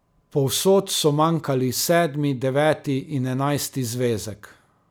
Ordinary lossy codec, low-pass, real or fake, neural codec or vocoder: none; none; real; none